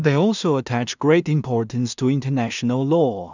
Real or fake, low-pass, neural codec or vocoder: fake; 7.2 kHz; codec, 16 kHz in and 24 kHz out, 0.4 kbps, LongCat-Audio-Codec, two codebook decoder